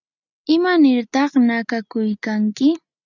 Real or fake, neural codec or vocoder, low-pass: real; none; 7.2 kHz